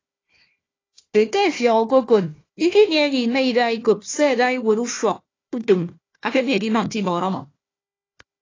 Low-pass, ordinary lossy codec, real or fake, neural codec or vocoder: 7.2 kHz; AAC, 32 kbps; fake; codec, 16 kHz, 1 kbps, FunCodec, trained on Chinese and English, 50 frames a second